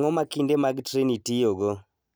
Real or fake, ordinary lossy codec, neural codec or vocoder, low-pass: real; none; none; none